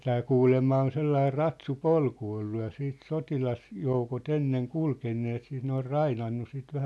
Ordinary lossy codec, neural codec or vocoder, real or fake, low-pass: none; none; real; none